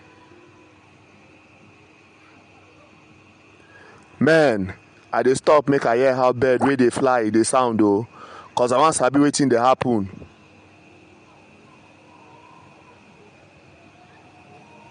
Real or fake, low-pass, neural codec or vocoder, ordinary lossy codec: real; 9.9 kHz; none; MP3, 64 kbps